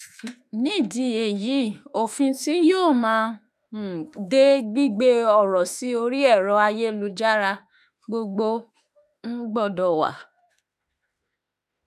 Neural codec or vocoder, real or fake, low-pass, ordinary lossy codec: autoencoder, 48 kHz, 32 numbers a frame, DAC-VAE, trained on Japanese speech; fake; 14.4 kHz; none